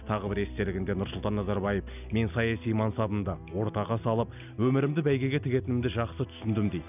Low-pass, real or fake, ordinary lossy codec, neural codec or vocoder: 3.6 kHz; real; none; none